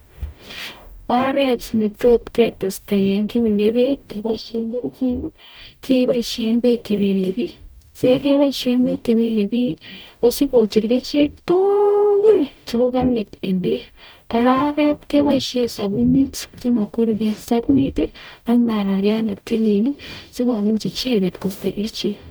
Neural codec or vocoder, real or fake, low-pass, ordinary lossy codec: codec, 44.1 kHz, 0.9 kbps, DAC; fake; none; none